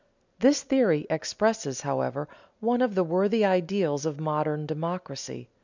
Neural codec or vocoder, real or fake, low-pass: none; real; 7.2 kHz